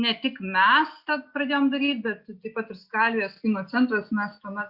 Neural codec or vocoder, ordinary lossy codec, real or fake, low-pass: none; Opus, 64 kbps; real; 5.4 kHz